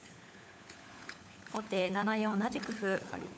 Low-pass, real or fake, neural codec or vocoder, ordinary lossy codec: none; fake; codec, 16 kHz, 16 kbps, FunCodec, trained on LibriTTS, 50 frames a second; none